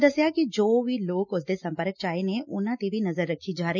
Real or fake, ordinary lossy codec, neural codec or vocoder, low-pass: real; none; none; 7.2 kHz